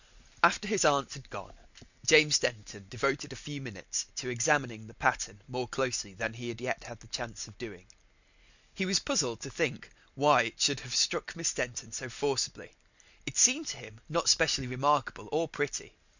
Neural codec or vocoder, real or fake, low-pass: none; real; 7.2 kHz